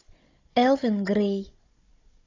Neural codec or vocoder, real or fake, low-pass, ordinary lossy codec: codec, 16 kHz, 16 kbps, FunCodec, trained on Chinese and English, 50 frames a second; fake; 7.2 kHz; AAC, 32 kbps